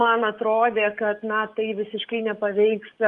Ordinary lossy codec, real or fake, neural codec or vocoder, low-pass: Opus, 32 kbps; fake; codec, 44.1 kHz, 7.8 kbps, DAC; 10.8 kHz